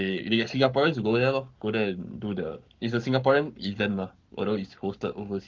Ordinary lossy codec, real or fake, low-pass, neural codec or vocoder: Opus, 24 kbps; fake; 7.2 kHz; codec, 44.1 kHz, 7.8 kbps, Pupu-Codec